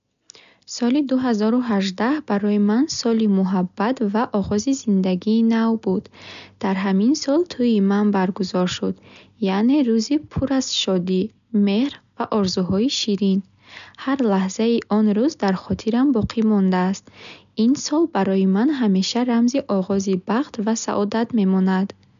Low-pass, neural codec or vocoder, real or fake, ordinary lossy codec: 7.2 kHz; none; real; none